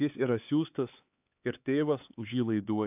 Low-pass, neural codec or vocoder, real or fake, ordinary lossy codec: 3.6 kHz; codec, 16 kHz, 4 kbps, X-Codec, HuBERT features, trained on LibriSpeech; fake; AAC, 32 kbps